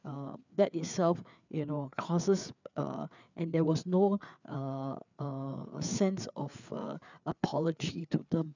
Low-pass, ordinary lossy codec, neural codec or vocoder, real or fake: 7.2 kHz; none; codec, 16 kHz, 4 kbps, FreqCodec, larger model; fake